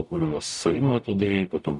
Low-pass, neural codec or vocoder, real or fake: 10.8 kHz; codec, 44.1 kHz, 0.9 kbps, DAC; fake